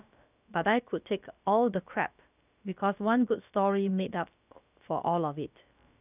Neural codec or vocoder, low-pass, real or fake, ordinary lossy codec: codec, 16 kHz, about 1 kbps, DyCAST, with the encoder's durations; 3.6 kHz; fake; none